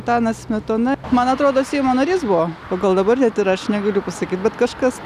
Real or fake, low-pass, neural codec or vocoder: real; 14.4 kHz; none